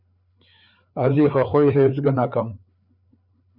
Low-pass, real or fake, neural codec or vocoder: 5.4 kHz; fake; codec, 16 kHz, 4 kbps, FreqCodec, larger model